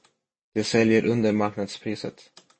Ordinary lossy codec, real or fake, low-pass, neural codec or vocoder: MP3, 32 kbps; real; 9.9 kHz; none